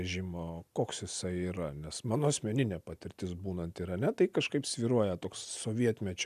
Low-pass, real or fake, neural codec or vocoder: 14.4 kHz; real; none